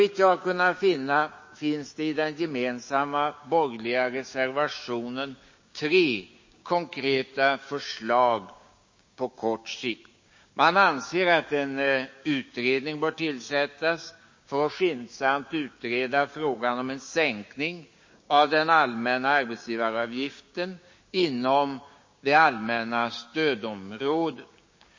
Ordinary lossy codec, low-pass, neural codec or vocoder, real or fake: MP3, 32 kbps; 7.2 kHz; codec, 16 kHz, 6 kbps, DAC; fake